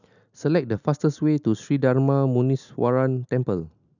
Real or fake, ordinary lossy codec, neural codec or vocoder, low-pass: real; none; none; 7.2 kHz